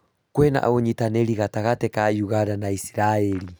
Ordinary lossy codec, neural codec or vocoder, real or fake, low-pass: none; none; real; none